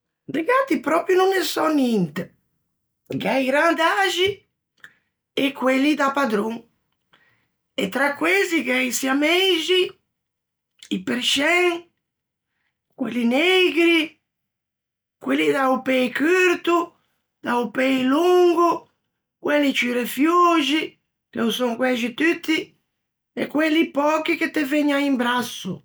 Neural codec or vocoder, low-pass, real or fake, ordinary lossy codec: none; none; real; none